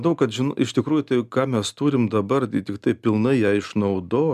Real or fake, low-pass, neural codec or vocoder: real; 14.4 kHz; none